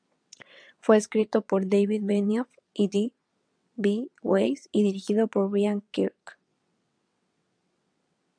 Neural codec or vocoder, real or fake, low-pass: vocoder, 22.05 kHz, 80 mel bands, WaveNeXt; fake; 9.9 kHz